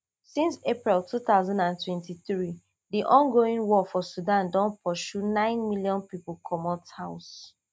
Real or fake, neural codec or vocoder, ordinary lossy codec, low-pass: real; none; none; none